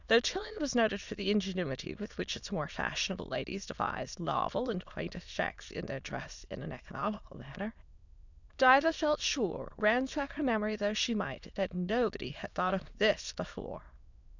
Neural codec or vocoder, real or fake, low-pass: autoencoder, 22.05 kHz, a latent of 192 numbers a frame, VITS, trained on many speakers; fake; 7.2 kHz